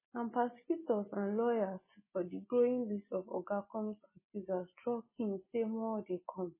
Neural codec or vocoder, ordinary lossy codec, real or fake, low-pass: none; MP3, 16 kbps; real; 3.6 kHz